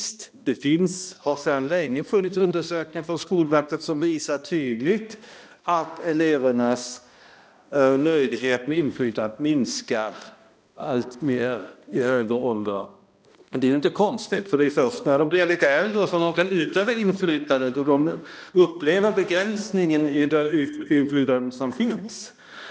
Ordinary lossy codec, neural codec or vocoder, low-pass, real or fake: none; codec, 16 kHz, 1 kbps, X-Codec, HuBERT features, trained on balanced general audio; none; fake